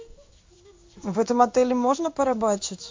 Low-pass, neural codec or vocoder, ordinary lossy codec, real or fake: 7.2 kHz; codec, 16 kHz in and 24 kHz out, 1 kbps, XY-Tokenizer; MP3, 48 kbps; fake